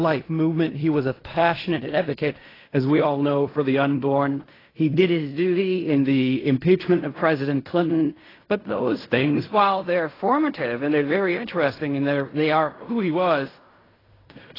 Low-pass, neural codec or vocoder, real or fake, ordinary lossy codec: 5.4 kHz; codec, 16 kHz in and 24 kHz out, 0.4 kbps, LongCat-Audio-Codec, fine tuned four codebook decoder; fake; AAC, 24 kbps